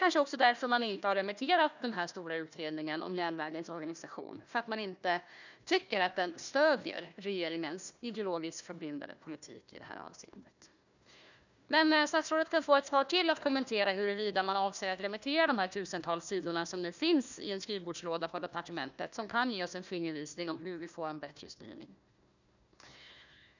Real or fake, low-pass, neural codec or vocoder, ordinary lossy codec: fake; 7.2 kHz; codec, 16 kHz, 1 kbps, FunCodec, trained on Chinese and English, 50 frames a second; none